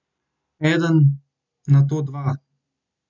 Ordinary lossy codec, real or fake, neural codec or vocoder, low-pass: MP3, 64 kbps; real; none; 7.2 kHz